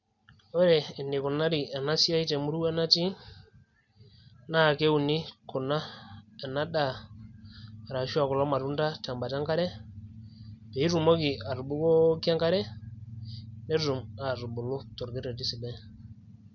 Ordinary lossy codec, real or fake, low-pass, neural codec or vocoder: none; real; 7.2 kHz; none